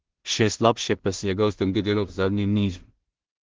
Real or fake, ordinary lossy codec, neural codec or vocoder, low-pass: fake; Opus, 16 kbps; codec, 16 kHz in and 24 kHz out, 0.4 kbps, LongCat-Audio-Codec, two codebook decoder; 7.2 kHz